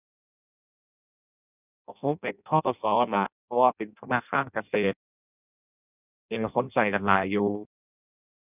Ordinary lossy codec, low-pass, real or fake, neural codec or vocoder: none; 3.6 kHz; fake; codec, 16 kHz in and 24 kHz out, 0.6 kbps, FireRedTTS-2 codec